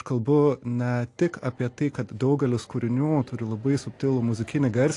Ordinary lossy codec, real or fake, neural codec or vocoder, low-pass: AAC, 48 kbps; real; none; 10.8 kHz